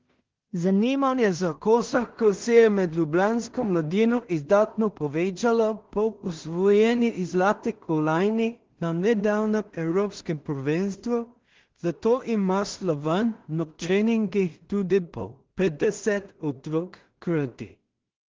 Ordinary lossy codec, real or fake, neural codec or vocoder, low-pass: Opus, 16 kbps; fake; codec, 16 kHz in and 24 kHz out, 0.4 kbps, LongCat-Audio-Codec, two codebook decoder; 7.2 kHz